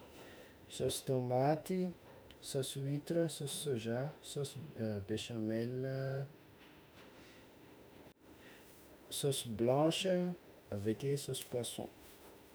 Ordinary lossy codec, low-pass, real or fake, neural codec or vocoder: none; none; fake; autoencoder, 48 kHz, 32 numbers a frame, DAC-VAE, trained on Japanese speech